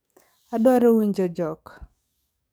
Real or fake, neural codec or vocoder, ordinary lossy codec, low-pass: fake; codec, 44.1 kHz, 7.8 kbps, DAC; none; none